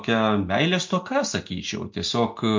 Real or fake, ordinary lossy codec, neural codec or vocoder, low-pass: real; MP3, 48 kbps; none; 7.2 kHz